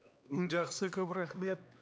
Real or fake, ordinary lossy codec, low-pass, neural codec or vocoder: fake; none; none; codec, 16 kHz, 2 kbps, X-Codec, HuBERT features, trained on LibriSpeech